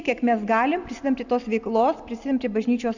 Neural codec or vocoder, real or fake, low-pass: none; real; 7.2 kHz